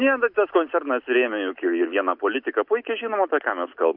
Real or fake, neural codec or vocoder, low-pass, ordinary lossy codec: real; none; 5.4 kHz; AAC, 48 kbps